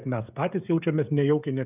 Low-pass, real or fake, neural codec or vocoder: 3.6 kHz; fake; codec, 16 kHz, 16 kbps, FreqCodec, smaller model